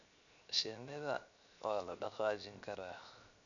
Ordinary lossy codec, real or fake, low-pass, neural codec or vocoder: none; fake; 7.2 kHz; codec, 16 kHz, 0.7 kbps, FocalCodec